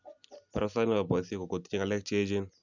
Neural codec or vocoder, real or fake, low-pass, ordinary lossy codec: none; real; 7.2 kHz; none